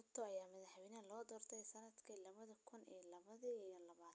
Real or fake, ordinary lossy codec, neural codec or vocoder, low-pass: real; none; none; none